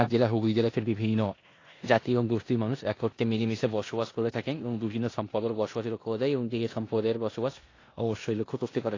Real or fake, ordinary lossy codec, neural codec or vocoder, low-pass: fake; AAC, 32 kbps; codec, 16 kHz in and 24 kHz out, 0.9 kbps, LongCat-Audio-Codec, four codebook decoder; 7.2 kHz